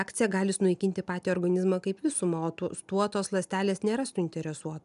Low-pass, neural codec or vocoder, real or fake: 10.8 kHz; none; real